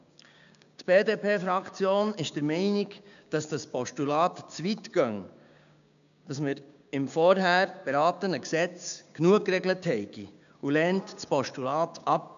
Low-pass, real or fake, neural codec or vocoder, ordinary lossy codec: 7.2 kHz; fake; codec, 16 kHz, 6 kbps, DAC; none